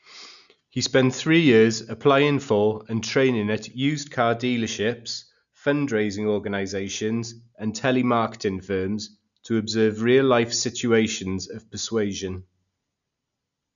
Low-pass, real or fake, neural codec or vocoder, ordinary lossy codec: 7.2 kHz; real; none; none